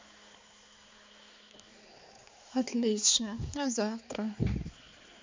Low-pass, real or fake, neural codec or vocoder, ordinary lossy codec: 7.2 kHz; fake; codec, 16 kHz, 4 kbps, X-Codec, HuBERT features, trained on balanced general audio; MP3, 64 kbps